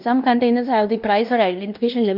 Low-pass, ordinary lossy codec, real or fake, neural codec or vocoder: 5.4 kHz; none; fake; codec, 16 kHz in and 24 kHz out, 0.9 kbps, LongCat-Audio-Codec, fine tuned four codebook decoder